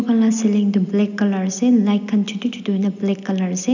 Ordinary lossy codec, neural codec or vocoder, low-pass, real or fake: none; none; 7.2 kHz; real